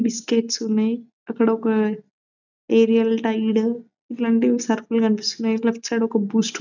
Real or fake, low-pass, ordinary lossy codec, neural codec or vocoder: real; 7.2 kHz; none; none